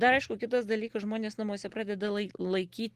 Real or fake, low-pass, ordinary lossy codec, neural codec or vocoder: real; 14.4 kHz; Opus, 16 kbps; none